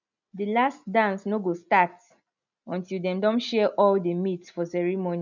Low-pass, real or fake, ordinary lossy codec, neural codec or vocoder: 7.2 kHz; real; none; none